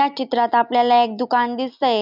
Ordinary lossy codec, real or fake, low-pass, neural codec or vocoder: none; real; 5.4 kHz; none